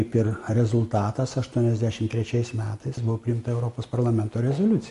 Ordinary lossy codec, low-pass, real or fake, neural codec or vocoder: MP3, 48 kbps; 14.4 kHz; real; none